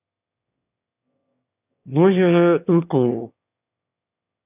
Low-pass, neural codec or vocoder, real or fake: 3.6 kHz; autoencoder, 22.05 kHz, a latent of 192 numbers a frame, VITS, trained on one speaker; fake